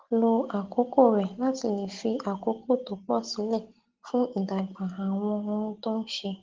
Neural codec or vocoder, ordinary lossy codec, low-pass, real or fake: none; Opus, 16 kbps; 7.2 kHz; real